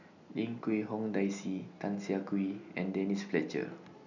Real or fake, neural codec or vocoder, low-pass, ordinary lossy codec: real; none; 7.2 kHz; none